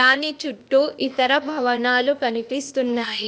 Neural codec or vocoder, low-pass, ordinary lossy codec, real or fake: codec, 16 kHz, 0.8 kbps, ZipCodec; none; none; fake